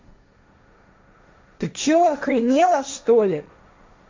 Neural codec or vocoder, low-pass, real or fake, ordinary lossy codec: codec, 16 kHz, 1.1 kbps, Voila-Tokenizer; 7.2 kHz; fake; MP3, 64 kbps